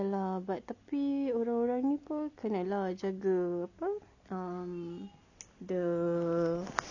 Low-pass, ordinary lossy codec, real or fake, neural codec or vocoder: 7.2 kHz; none; real; none